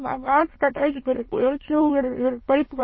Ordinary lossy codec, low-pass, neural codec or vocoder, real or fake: MP3, 24 kbps; 7.2 kHz; autoencoder, 22.05 kHz, a latent of 192 numbers a frame, VITS, trained on many speakers; fake